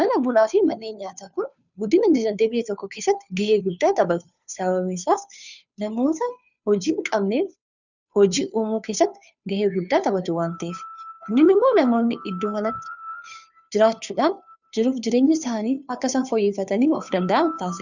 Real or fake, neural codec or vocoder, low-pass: fake; codec, 16 kHz, 2 kbps, FunCodec, trained on Chinese and English, 25 frames a second; 7.2 kHz